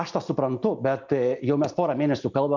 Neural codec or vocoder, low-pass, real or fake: none; 7.2 kHz; real